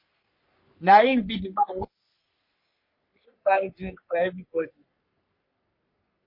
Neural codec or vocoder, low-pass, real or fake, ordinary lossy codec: codec, 44.1 kHz, 3.4 kbps, Pupu-Codec; 5.4 kHz; fake; MP3, 32 kbps